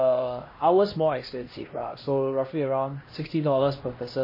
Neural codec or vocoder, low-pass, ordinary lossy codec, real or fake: codec, 16 kHz, 1 kbps, X-Codec, HuBERT features, trained on LibriSpeech; 5.4 kHz; MP3, 24 kbps; fake